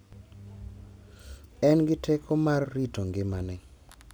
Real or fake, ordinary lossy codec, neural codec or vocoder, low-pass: real; none; none; none